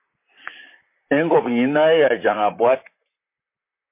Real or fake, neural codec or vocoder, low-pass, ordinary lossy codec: fake; vocoder, 44.1 kHz, 128 mel bands, Pupu-Vocoder; 3.6 kHz; MP3, 24 kbps